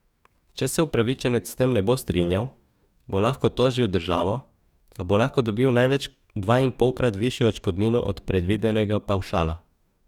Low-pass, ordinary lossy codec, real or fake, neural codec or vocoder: 19.8 kHz; none; fake; codec, 44.1 kHz, 2.6 kbps, DAC